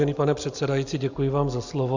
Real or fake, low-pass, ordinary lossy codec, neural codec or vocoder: real; 7.2 kHz; Opus, 64 kbps; none